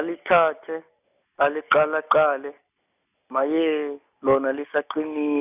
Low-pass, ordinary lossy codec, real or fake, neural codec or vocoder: 3.6 kHz; none; real; none